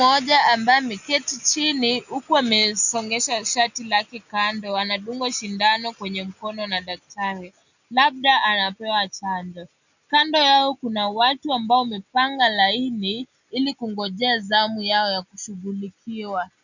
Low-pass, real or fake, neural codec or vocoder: 7.2 kHz; real; none